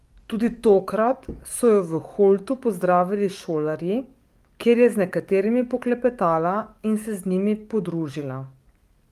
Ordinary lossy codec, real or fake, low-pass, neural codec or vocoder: Opus, 32 kbps; fake; 14.4 kHz; codec, 44.1 kHz, 7.8 kbps, DAC